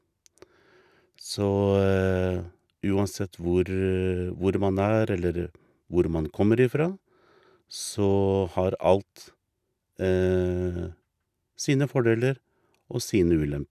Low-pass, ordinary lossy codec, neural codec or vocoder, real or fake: 14.4 kHz; none; none; real